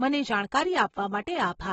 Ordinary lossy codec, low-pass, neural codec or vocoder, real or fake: AAC, 24 kbps; 14.4 kHz; none; real